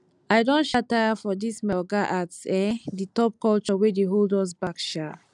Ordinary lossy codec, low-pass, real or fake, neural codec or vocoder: none; 10.8 kHz; real; none